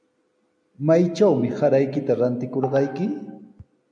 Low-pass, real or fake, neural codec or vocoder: 9.9 kHz; real; none